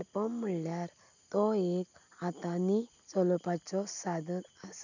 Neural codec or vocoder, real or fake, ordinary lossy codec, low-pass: none; real; none; 7.2 kHz